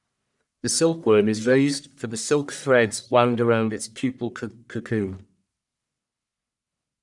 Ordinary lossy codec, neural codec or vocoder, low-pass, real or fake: none; codec, 44.1 kHz, 1.7 kbps, Pupu-Codec; 10.8 kHz; fake